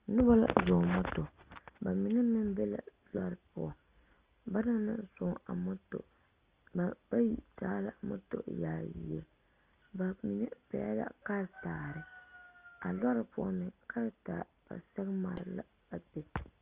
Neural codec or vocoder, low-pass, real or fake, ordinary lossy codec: none; 3.6 kHz; real; Opus, 24 kbps